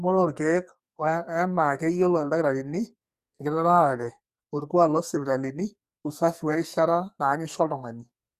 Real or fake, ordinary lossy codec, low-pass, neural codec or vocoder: fake; Opus, 64 kbps; 14.4 kHz; codec, 44.1 kHz, 2.6 kbps, SNAC